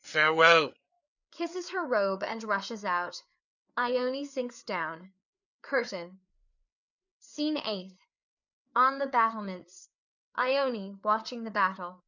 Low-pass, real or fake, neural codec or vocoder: 7.2 kHz; fake; codec, 16 kHz, 4 kbps, FreqCodec, larger model